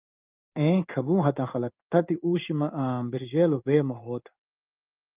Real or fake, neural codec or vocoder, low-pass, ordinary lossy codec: fake; codec, 16 kHz in and 24 kHz out, 1 kbps, XY-Tokenizer; 3.6 kHz; Opus, 32 kbps